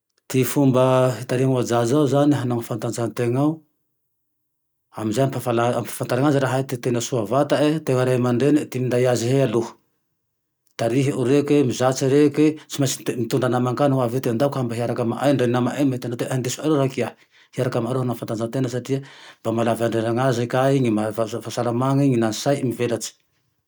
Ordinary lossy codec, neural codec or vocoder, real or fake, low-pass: none; none; real; none